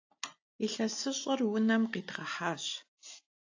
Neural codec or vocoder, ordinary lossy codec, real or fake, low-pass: none; AAC, 48 kbps; real; 7.2 kHz